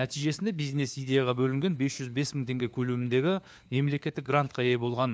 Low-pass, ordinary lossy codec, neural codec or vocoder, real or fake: none; none; codec, 16 kHz, 4 kbps, FunCodec, trained on LibriTTS, 50 frames a second; fake